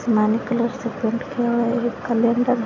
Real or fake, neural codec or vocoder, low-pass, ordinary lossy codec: real; none; 7.2 kHz; AAC, 32 kbps